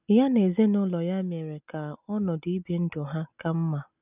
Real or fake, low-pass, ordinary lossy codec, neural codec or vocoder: real; 3.6 kHz; none; none